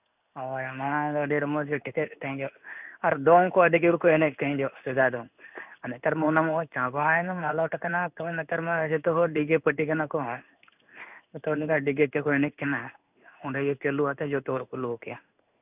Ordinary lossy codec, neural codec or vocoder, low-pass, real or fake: none; codec, 16 kHz in and 24 kHz out, 1 kbps, XY-Tokenizer; 3.6 kHz; fake